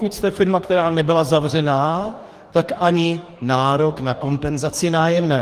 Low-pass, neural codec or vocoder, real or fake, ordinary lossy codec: 14.4 kHz; codec, 44.1 kHz, 2.6 kbps, DAC; fake; Opus, 24 kbps